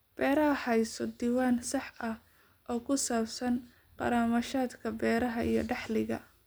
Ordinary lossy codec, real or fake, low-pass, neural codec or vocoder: none; real; none; none